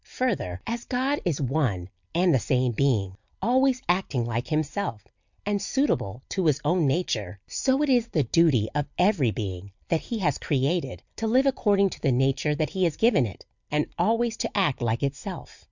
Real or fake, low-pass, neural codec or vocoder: real; 7.2 kHz; none